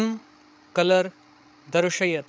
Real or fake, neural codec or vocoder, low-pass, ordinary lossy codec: fake; codec, 16 kHz, 16 kbps, FreqCodec, larger model; none; none